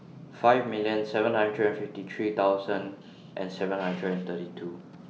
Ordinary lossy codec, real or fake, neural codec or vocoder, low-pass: none; real; none; none